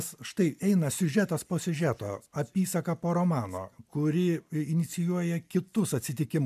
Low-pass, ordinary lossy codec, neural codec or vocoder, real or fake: 14.4 kHz; MP3, 96 kbps; none; real